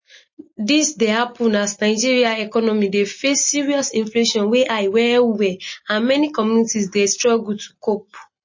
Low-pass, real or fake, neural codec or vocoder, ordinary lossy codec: 7.2 kHz; real; none; MP3, 32 kbps